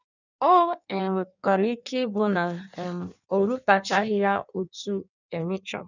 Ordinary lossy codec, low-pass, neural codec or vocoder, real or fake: none; 7.2 kHz; codec, 16 kHz in and 24 kHz out, 1.1 kbps, FireRedTTS-2 codec; fake